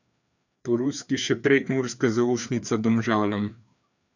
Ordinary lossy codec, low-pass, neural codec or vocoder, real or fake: none; 7.2 kHz; codec, 16 kHz, 2 kbps, FreqCodec, larger model; fake